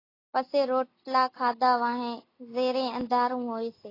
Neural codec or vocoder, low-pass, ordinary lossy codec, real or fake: none; 5.4 kHz; AAC, 24 kbps; real